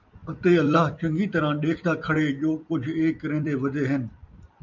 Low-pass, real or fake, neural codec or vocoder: 7.2 kHz; fake; vocoder, 44.1 kHz, 128 mel bands every 256 samples, BigVGAN v2